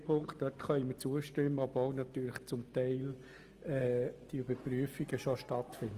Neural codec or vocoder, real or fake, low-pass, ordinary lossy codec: none; real; 14.4 kHz; Opus, 24 kbps